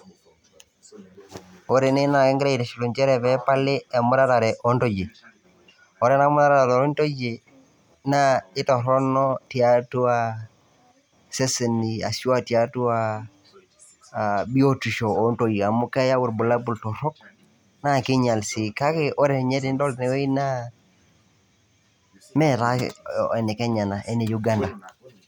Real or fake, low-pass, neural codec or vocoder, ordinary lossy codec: real; 19.8 kHz; none; none